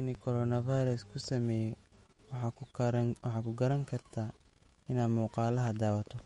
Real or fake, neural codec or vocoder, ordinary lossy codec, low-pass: fake; autoencoder, 48 kHz, 128 numbers a frame, DAC-VAE, trained on Japanese speech; MP3, 48 kbps; 19.8 kHz